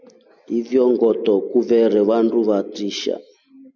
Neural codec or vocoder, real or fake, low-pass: none; real; 7.2 kHz